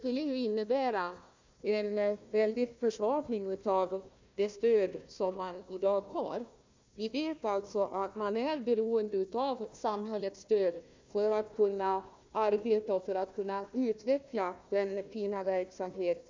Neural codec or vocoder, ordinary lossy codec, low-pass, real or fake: codec, 16 kHz, 1 kbps, FunCodec, trained on Chinese and English, 50 frames a second; MP3, 64 kbps; 7.2 kHz; fake